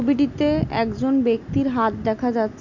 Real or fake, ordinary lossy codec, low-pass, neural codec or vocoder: real; none; 7.2 kHz; none